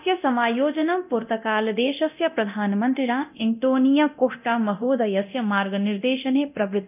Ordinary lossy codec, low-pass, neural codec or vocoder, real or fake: none; 3.6 kHz; codec, 24 kHz, 0.9 kbps, DualCodec; fake